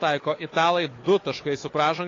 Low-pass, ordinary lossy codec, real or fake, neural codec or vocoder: 7.2 kHz; AAC, 32 kbps; fake; codec, 16 kHz, 4 kbps, FunCodec, trained on Chinese and English, 50 frames a second